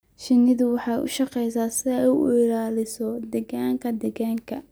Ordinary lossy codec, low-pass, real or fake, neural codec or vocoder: none; none; real; none